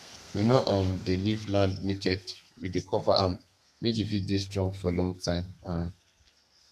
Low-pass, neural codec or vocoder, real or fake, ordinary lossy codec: 14.4 kHz; codec, 32 kHz, 1.9 kbps, SNAC; fake; none